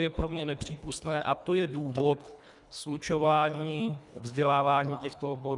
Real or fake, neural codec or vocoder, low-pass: fake; codec, 24 kHz, 1.5 kbps, HILCodec; 10.8 kHz